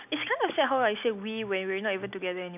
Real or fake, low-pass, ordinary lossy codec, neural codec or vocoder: real; 3.6 kHz; none; none